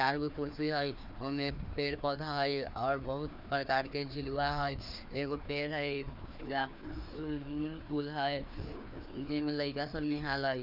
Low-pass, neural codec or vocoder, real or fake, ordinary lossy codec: 5.4 kHz; codec, 16 kHz, 2 kbps, FreqCodec, larger model; fake; none